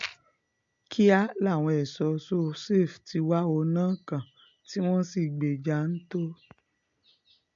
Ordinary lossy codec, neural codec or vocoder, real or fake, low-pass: MP3, 64 kbps; none; real; 7.2 kHz